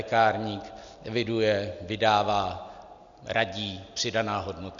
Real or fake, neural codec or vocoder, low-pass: real; none; 7.2 kHz